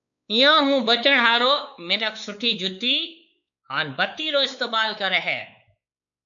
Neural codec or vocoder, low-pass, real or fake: codec, 16 kHz, 4 kbps, X-Codec, WavLM features, trained on Multilingual LibriSpeech; 7.2 kHz; fake